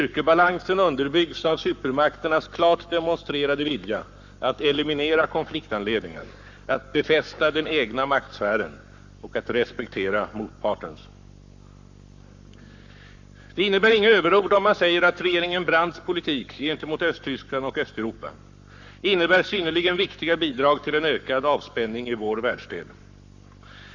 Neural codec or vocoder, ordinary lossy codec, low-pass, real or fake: codec, 44.1 kHz, 7.8 kbps, Pupu-Codec; Opus, 64 kbps; 7.2 kHz; fake